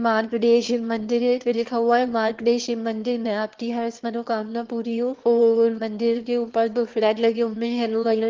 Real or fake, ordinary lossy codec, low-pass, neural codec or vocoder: fake; Opus, 16 kbps; 7.2 kHz; autoencoder, 22.05 kHz, a latent of 192 numbers a frame, VITS, trained on one speaker